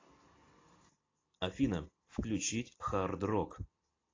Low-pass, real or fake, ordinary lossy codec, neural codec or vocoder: 7.2 kHz; real; AAC, 32 kbps; none